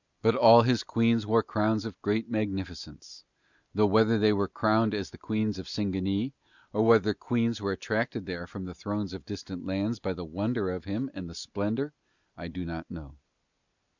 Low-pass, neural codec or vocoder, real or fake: 7.2 kHz; none; real